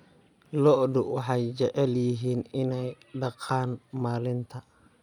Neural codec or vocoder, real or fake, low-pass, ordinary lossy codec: none; real; 19.8 kHz; none